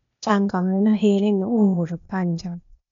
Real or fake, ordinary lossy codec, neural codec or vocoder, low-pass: fake; none; codec, 16 kHz, 0.8 kbps, ZipCodec; 7.2 kHz